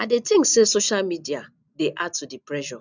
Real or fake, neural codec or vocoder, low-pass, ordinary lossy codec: real; none; 7.2 kHz; none